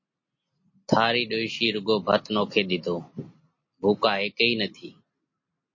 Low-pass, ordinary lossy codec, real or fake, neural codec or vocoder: 7.2 kHz; MP3, 32 kbps; real; none